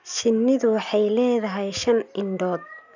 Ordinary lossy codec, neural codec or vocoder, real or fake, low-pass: none; none; real; 7.2 kHz